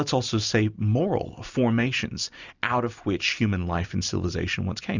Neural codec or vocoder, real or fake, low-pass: none; real; 7.2 kHz